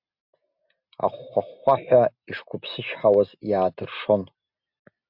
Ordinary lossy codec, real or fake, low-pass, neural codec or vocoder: MP3, 48 kbps; real; 5.4 kHz; none